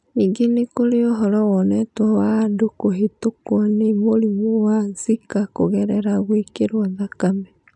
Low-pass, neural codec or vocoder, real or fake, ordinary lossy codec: 10.8 kHz; none; real; none